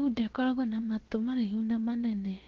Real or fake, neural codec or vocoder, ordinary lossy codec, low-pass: fake; codec, 16 kHz, about 1 kbps, DyCAST, with the encoder's durations; Opus, 16 kbps; 7.2 kHz